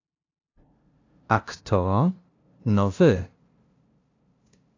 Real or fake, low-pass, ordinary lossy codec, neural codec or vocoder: fake; 7.2 kHz; AAC, 48 kbps; codec, 16 kHz, 0.5 kbps, FunCodec, trained on LibriTTS, 25 frames a second